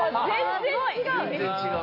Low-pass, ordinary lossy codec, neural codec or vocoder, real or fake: 5.4 kHz; MP3, 32 kbps; none; real